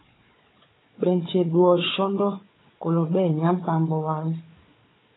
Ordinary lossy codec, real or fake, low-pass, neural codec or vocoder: AAC, 16 kbps; fake; 7.2 kHz; codec, 16 kHz, 4 kbps, FunCodec, trained on Chinese and English, 50 frames a second